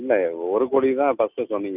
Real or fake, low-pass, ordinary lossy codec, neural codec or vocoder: real; 3.6 kHz; none; none